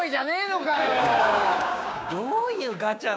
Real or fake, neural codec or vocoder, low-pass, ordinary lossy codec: fake; codec, 16 kHz, 6 kbps, DAC; none; none